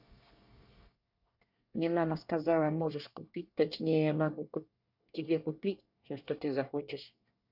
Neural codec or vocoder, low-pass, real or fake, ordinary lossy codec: codec, 24 kHz, 1 kbps, SNAC; 5.4 kHz; fake; none